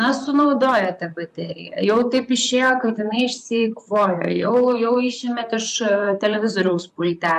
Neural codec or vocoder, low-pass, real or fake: vocoder, 44.1 kHz, 128 mel bands, Pupu-Vocoder; 14.4 kHz; fake